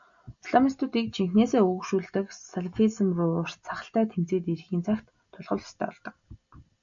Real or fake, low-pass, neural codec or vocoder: real; 7.2 kHz; none